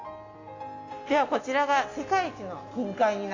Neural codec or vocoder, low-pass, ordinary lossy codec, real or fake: none; 7.2 kHz; AAC, 32 kbps; real